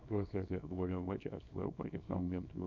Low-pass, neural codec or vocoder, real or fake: 7.2 kHz; codec, 24 kHz, 0.9 kbps, WavTokenizer, small release; fake